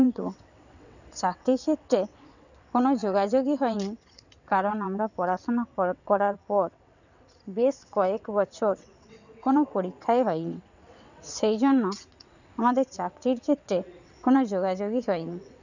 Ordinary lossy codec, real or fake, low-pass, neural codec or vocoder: none; fake; 7.2 kHz; vocoder, 22.05 kHz, 80 mel bands, WaveNeXt